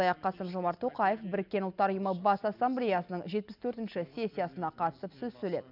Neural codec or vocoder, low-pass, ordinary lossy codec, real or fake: none; 5.4 kHz; none; real